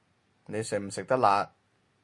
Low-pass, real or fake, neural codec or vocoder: 10.8 kHz; real; none